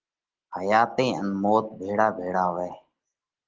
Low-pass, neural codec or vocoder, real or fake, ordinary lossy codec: 7.2 kHz; none; real; Opus, 16 kbps